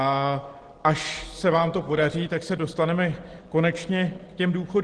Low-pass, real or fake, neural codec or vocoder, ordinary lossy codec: 9.9 kHz; real; none; Opus, 16 kbps